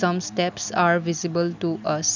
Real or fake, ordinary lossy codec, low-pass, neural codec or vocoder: real; none; 7.2 kHz; none